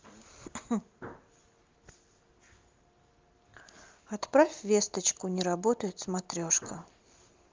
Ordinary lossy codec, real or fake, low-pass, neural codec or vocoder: Opus, 32 kbps; real; 7.2 kHz; none